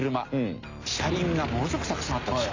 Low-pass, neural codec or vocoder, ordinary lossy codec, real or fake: 7.2 kHz; none; AAC, 48 kbps; real